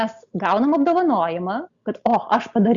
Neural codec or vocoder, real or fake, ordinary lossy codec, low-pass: none; real; Opus, 64 kbps; 7.2 kHz